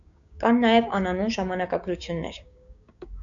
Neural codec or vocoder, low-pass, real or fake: codec, 16 kHz, 6 kbps, DAC; 7.2 kHz; fake